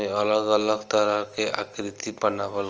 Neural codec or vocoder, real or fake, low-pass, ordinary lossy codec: none; real; 7.2 kHz; Opus, 24 kbps